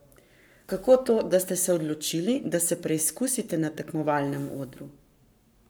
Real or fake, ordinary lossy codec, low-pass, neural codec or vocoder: fake; none; none; codec, 44.1 kHz, 7.8 kbps, Pupu-Codec